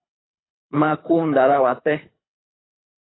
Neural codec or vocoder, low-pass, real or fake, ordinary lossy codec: codec, 24 kHz, 3 kbps, HILCodec; 7.2 kHz; fake; AAC, 16 kbps